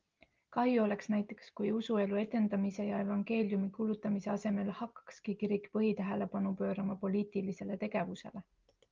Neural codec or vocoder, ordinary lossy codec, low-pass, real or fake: none; Opus, 24 kbps; 7.2 kHz; real